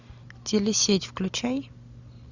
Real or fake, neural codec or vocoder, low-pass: real; none; 7.2 kHz